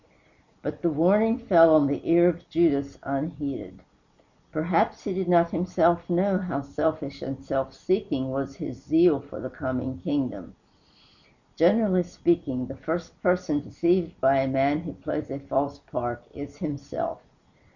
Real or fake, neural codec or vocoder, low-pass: real; none; 7.2 kHz